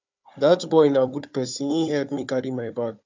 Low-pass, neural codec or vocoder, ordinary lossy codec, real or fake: 7.2 kHz; codec, 16 kHz, 4 kbps, FunCodec, trained on Chinese and English, 50 frames a second; MP3, 64 kbps; fake